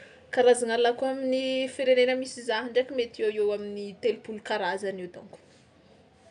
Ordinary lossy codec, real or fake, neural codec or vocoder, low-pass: none; real; none; 9.9 kHz